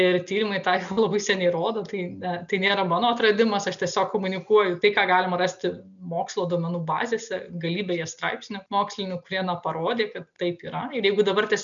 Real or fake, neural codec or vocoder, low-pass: real; none; 7.2 kHz